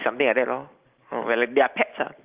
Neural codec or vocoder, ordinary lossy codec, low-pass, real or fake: none; Opus, 32 kbps; 3.6 kHz; real